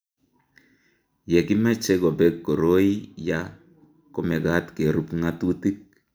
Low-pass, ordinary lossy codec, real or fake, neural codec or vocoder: none; none; real; none